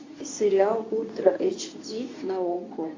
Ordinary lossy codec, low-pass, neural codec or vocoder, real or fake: AAC, 32 kbps; 7.2 kHz; codec, 24 kHz, 0.9 kbps, WavTokenizer, medium speech release version 2; fake